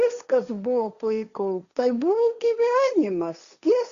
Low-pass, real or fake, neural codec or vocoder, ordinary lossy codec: 7.2 kHz; fake; codec, 16 kHz, 1.1 kbps, Voila-Tokenizer; Opus, 64 kbps